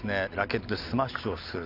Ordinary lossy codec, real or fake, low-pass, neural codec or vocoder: none; real; 5.4 kHz; none